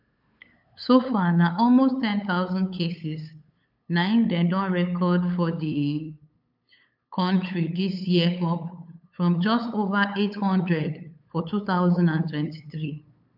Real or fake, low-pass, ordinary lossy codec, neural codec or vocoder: fake; 5.4 kHz; AAC, 48 kbps; codec, 16 kHz, 8 kbps, FunCodec, trained on LibriTTS, 25 frames a second